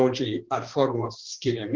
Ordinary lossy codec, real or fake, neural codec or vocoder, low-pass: Opus, 16 kbps; fake; codec, 16 kHz, 2 kbps, X-Codec, HuBERT features, trained on balanced general audio; 7.2 kHz